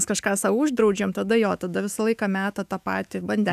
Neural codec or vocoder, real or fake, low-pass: autoencoder, 48 kHz, 128 numbers a frame, DAC-VAE, trained on Japanese speech; fake; 14.4 kHz